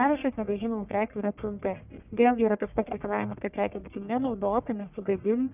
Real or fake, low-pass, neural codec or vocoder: fake; 3.6 kHz; codec, 44.1 kHz, 1.7 kbps, Pupu-Codec